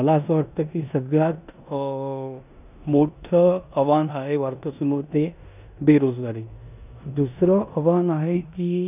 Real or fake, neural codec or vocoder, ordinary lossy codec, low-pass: fake; codec, 16 kHz in and 24 kHz out, 0.9 kbps, LongCat-Audio-Codec, four codebook decoder; none; 3.6 kHz